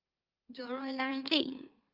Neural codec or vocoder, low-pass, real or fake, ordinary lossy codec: autoencoder, 44.1 kHz, a latent of 192 numbers a frame, MeloTTS; 5.4 kHz; fake; Opus, 24 kbps